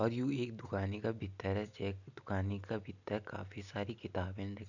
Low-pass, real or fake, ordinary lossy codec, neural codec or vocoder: 7.2 kHz; real; none; none